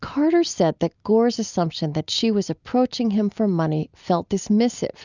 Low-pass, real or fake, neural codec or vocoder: 7.2 kHz; real; none